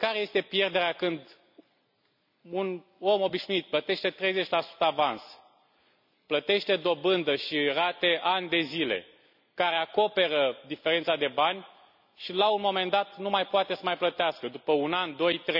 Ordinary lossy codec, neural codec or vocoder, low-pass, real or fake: none; none; 5.4 kHz; real